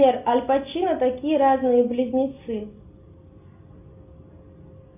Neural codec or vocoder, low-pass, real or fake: none; 3.6 kHz; real